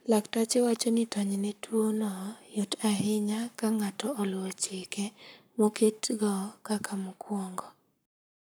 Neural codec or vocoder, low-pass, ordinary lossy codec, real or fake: codec, 44.1 kHz, 7.8 kbps, Pupu-Codec; none; none; fake